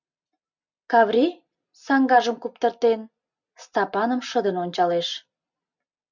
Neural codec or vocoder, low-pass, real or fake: none; 7.2 kHz; real